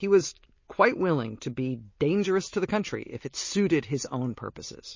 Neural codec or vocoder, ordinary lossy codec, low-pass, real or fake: none; MP3, 32 kbps; 7.2 kHz; real